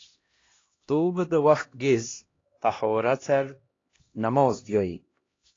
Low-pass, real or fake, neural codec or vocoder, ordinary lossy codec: 7.2 kHz; fake; codec, 16 kHz, 1 kbps, X-Codec, HuBERT features, trained on LibriSpeech; AAC, 32 kbps